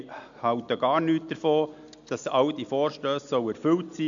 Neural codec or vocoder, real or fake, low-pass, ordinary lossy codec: none; real; 7.2 kHz; MP3, 64 kbps